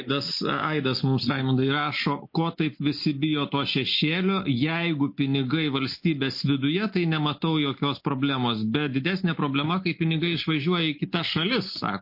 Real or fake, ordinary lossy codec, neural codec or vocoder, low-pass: real; MP3, 32 kbps; none; 5.4 kHz